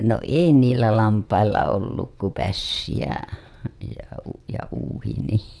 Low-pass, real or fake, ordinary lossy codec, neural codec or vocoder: none; fake; none; vocoder, 22.05 kHz, 80 mel bands, WaveNeXt